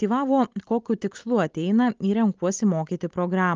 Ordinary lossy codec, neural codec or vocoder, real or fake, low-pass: Opus, 32 kbps; none; real; 7.2 kHz